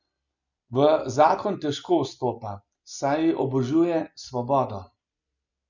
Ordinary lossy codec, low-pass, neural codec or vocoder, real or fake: none; 7.2 kHz; none; real